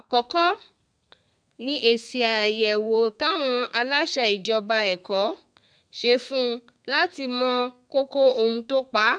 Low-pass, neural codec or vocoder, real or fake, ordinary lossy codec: 9.9 kHz; codec, 32 kHz, 1.9 kbps, SNAC; fake; MP3, 96 kbps